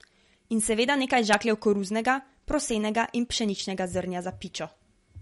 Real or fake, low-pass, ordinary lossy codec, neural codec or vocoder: real; 19.8 kHz; MP3, 48 kbps; none